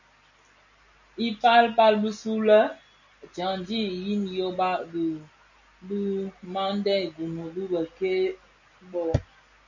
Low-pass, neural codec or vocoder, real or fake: 7.2 kHz; none; real